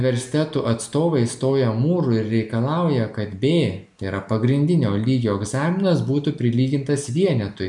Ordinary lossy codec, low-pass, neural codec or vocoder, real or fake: MP3, 96 kbps; 10.8 kHz; none; real